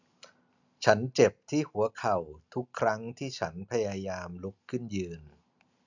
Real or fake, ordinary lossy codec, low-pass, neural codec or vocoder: real; none; 7.2 kHz; none